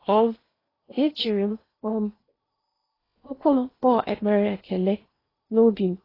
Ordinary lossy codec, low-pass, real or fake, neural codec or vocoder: AAC, 24 kbps; 5.4 kHz; fake; codec, 16 kHz in and 24 kHz out, 0.8 kbps, FocalCodec, streaming, 65536 codes